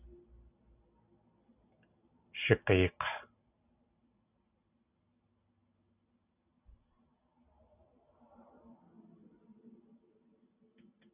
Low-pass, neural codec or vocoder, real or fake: 3.6 kHz; none; real